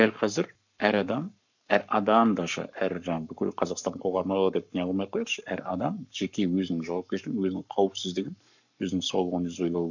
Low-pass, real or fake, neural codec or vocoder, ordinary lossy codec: 7.2 kHz; fake; codec, 44.1 kHz, 7.8 kbps, Pupu-Codec; none